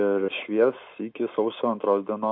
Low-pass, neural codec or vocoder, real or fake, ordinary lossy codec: 5.4 kHz; none; real; MP3, 32 kbps